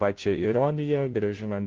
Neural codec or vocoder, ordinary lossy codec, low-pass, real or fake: codec, 16 kHz, 0.5 kbps, FunCodec, trained on Chinese and English, 25 frames a second; Opus, 16 kbps; 7.2 kHz; fake